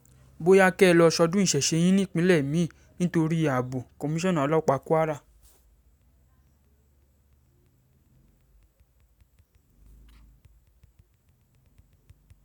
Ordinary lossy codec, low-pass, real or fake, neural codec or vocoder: none; none; real; none